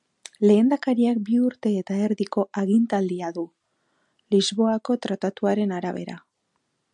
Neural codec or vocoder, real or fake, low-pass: none; real; 10.8 kHz